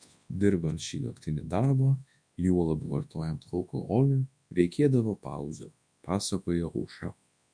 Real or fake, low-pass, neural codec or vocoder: fake; 9.9 kHz; codec, 24 kHz, 0.9 kbps, WavTokenizer, large speech release